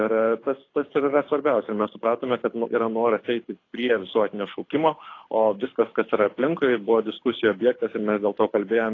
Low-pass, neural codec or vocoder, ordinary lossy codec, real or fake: 7.2 kHz; codec, 24 kHz, 6 kbps, HILCodec; AAC, 32 kbps; fake